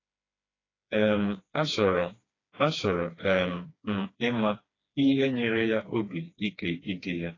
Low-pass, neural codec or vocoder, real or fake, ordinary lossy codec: 7.2 kHz; codec, 16 kHz, 2 kbps, FreqCodec, smaller model; fake; AAC, 32 kbps